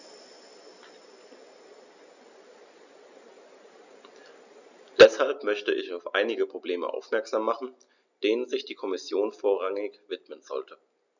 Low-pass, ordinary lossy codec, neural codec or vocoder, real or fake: 7.2 kHz; none; none; real